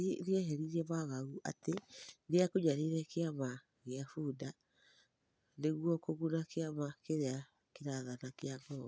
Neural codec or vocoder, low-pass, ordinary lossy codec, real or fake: none; none; none; real